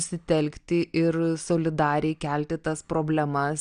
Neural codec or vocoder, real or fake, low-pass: none; real; 9.9 kHz